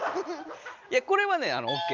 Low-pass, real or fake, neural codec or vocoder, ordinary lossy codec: 7.2 kHz; real; none; Opus, 24 kbps